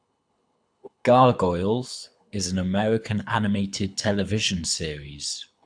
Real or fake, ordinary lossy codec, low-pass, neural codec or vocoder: fake; AAC, 64 kbps; 9.9 kHz; codec, 24 kHz, 6 kbps, HILCodec